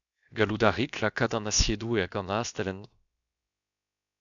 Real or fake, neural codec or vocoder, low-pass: fake; codec, 16 kHz, about 1 kbps, DyCAST, with the encoder's durations; 7.2 kHz